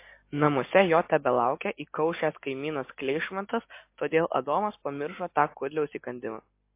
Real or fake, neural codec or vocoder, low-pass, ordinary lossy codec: real; none; 3.6 kHz; MP3, 24 kbps